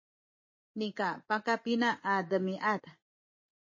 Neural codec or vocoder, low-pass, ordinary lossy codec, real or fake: vocoder, 24 kHz, 100 mel bands, Vocos; 7.2 kHz; MP3, 32 kbps; fake